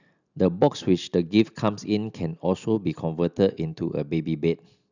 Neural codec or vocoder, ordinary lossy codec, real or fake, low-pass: none; none; real; 7.2 kHz